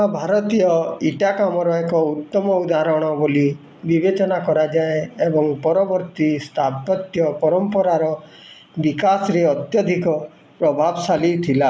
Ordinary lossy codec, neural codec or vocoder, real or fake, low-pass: none; none; real; none